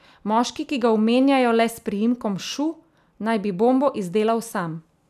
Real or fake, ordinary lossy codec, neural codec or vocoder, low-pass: real; none; none; 14.4 kHz